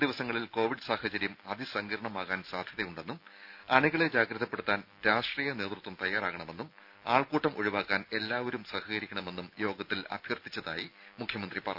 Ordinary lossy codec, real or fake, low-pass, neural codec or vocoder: none; real; 5.4 kHz; none